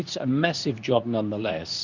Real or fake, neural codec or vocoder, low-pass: fake; codec, 24 kHz, 0.9 kbps, WavTokenizer, medium speech release version 2; 7.2 kHz